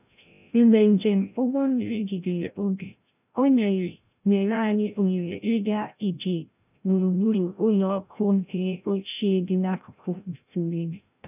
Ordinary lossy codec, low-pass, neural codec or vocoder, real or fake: none; 3.6 kHz; codec, 16 kHz, 0.5 kbps, FreqCodec, larger model; fake